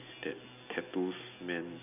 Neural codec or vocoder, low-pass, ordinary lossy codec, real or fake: none; 3.6 kHz; none; real